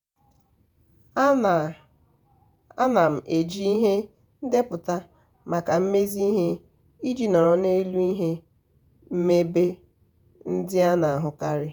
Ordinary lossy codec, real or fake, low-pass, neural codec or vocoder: none; fake; none; vocoder, 48 kHz, 128 mel bands, Vocos